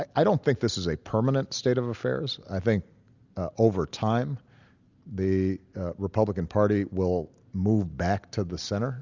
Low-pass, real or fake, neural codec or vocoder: 7.2 kHz; real; none